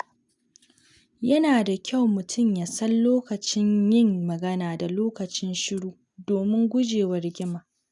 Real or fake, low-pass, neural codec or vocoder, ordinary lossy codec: real; 10.8 kHz; none; none